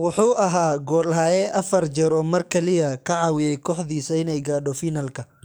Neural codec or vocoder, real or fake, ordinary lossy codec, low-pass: codec, 44.1 kHz, 7.8 kbps, DAC; fake; none; none